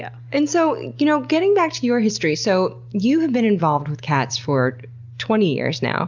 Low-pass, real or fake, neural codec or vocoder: 7.2 kHz; real; none